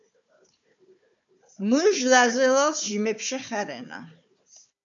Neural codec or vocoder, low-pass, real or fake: codec, 16 kHz, 4 kbps, FunCodec, trained on Chinese and English, 50 frames a second; 7.2 kHz; fake